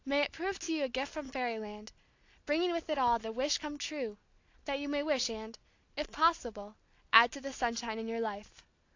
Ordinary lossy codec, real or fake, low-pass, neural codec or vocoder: AAC, 48 kbps; real; 7.2 kHz; none